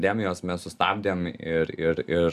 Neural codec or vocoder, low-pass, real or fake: none; 14.4 kHz; real